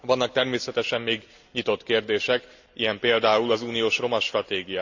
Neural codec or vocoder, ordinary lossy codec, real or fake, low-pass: none; Opus, 64 kbps; real; 7.2 kHz